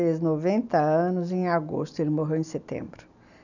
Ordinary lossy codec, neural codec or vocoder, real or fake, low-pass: none; none; real; 7.2 kHz